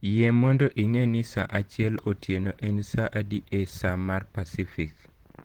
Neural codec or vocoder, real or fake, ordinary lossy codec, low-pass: vocoder, 44.1 kHz, 128 mel bands, Pupu-Vocoder; fake; Opus, 16 kbps; 19.8 kHz